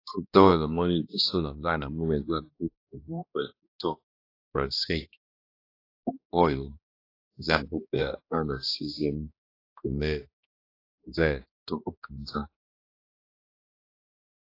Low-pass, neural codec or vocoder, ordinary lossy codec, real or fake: 5.4 kHz; codec, 16 kHz, 1 kbps, X-Codec, HuBERT features, trained on balanced general audio; AAC, 32 kbps; fake